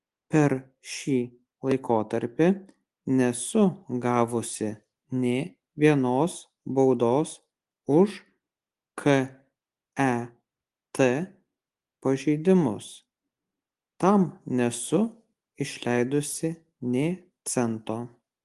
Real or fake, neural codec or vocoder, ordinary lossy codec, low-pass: real; none; Opus, 24 kbps; 10.8 kHz